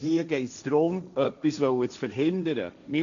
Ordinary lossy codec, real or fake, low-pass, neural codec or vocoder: MP3, 96 kbps; fake; 7.2 kHz; codec, 16 kHz, 1.1 kbps, Voila-Tokenizer